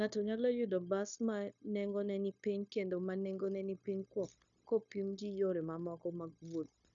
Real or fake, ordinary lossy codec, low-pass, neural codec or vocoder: fake; none; 7.2 kHz; codec, 16 kHz, 0.9 kbps, LongCat-Audio-Codec